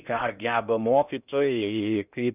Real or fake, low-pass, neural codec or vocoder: fake; 3.6 kHz; codec, 16 kHz in and 24 kHz out, 0.6 kbps, FocalCodec, streaming, 4096 codes